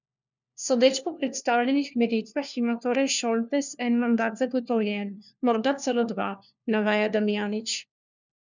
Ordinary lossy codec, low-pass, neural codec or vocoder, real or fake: none; 7.2 kHz; codec, 16 kHz, 1 kbps, FunCodec, trained on LibriTTS, 50 frames a second; fake